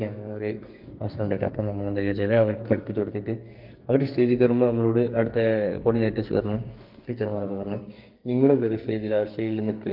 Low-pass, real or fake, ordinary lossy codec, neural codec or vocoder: 5.4 kHz; fake; Opus, 32 kbps; codec, 44.1 kHz, 2.6 kbps, SNAC